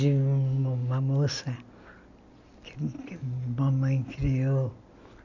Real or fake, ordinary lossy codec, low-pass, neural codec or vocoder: real; none; 7.2 kHz; none